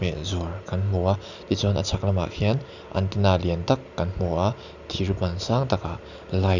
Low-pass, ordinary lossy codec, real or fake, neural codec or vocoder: 7.2 kHz; none; real; none